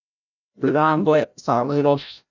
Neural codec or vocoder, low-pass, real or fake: codec, 16 kHz, 0.5 kbps, FreqCodec, larger model; 7.2 kHz; fake